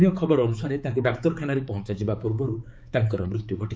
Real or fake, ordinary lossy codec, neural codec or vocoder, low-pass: fake; none; codec, 16 kHz, 4 kbps, X-Codec, HuBERT features, trained on balanced general audio; none